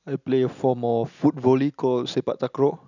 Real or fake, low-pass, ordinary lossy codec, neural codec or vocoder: real; 7.2 kHz; none; none